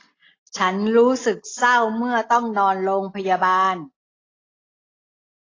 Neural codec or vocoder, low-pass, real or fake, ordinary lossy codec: none; 7.2 kHz; real; AAC, 32 kbps